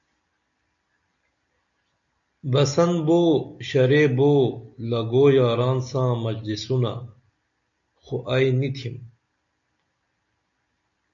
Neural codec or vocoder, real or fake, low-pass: none; real; 7.2 kHz